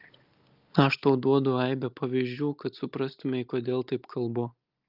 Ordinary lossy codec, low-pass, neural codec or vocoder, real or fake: Opus, 24 kbps; 5.4 kHz; none; real